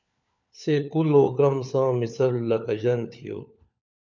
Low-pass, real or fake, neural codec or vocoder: 7.2 kHz; fake; codec, 16 kHz, 4 kbps, FunCodec, trained on LibriTTS, 50 frames a second